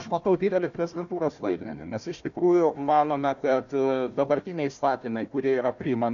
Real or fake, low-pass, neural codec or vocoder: fake; 7.2 kHz; codec, 16 kHz, 1 kbps, FunCodec, trained on Chinese and English, 50 frames a second